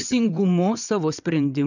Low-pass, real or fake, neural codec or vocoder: 7.2 kHz; fake; vocoder, 22.05 kHz, 80 mel bands, WaveNeXt